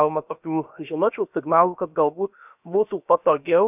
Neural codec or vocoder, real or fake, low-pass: codec, 16 kHz, about 1 kbps, DyCAST, with the encoder's durations; fake; 3.6 kHz